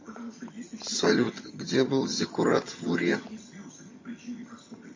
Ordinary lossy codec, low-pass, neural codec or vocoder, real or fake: MP3, 32 kbps; 7.2 kHz; vocoder, 22.05 kHz, 80 mel bands, HiFi-GAN; fake